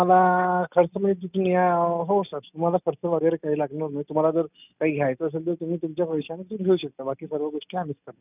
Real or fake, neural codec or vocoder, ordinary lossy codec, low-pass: real; none; none; 3.6 kHz